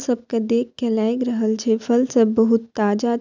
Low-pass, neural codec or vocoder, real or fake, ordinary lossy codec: 7.2 kHz; none; real; none